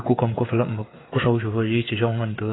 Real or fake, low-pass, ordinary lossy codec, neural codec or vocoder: fake; 7.2 kHz; AAC, 16 kbps; codec, 16 kHz, 8 kbps, FreqCodec, smaller model